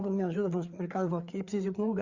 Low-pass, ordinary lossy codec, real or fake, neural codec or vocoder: 7.2 kHz; Opus, 64 kbps; fake; codec, 16 kHz, 4 kbps, FreqCodec, larger model